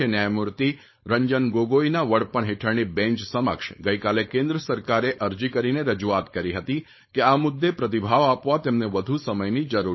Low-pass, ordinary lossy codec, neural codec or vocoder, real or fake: 7.2 kHz; MP3, 24 kbps; codec, 16 kHz, 4.8 kbps, FACodec; fake